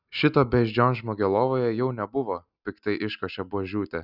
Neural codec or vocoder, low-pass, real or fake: none; 5.4 kHz; real